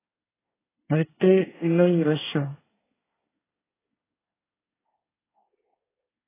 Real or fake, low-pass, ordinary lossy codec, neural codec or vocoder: fake; 3.6 kHz; AAC, 16 kbps; codec, 24 kHz, 1 kbps, SNAC